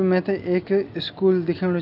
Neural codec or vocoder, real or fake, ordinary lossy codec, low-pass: none; real; AAC, 48 kbps; 5.4 kHz